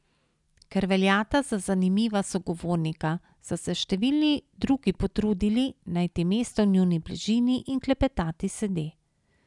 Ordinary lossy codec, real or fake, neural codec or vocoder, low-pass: none; real; none; 10.8 kHz